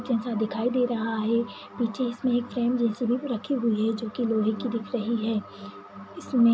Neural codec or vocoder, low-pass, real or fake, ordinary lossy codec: none; none; real; none